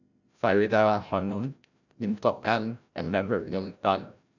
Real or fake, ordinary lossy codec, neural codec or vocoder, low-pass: fake; none; codec, 16 kHz, 0.5 kbps, FreqCodec, larger model; 7.2 kHz